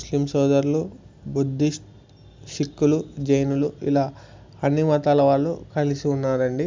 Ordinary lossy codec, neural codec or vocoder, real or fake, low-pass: MP3, 64 kbps; none; real; 7.2 kHz